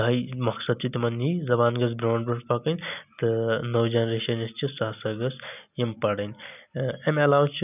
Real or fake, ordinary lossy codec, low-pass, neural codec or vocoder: real; none; 3.6 kHz; none